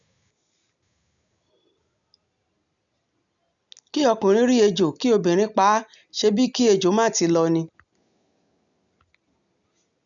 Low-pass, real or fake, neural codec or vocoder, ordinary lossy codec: 7.2 kHz; real; none; none